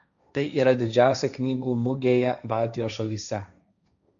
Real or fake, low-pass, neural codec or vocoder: fake; 7.2 kHz; codec, 16 kHz, 1.1 kbps, Voila-Tokenizer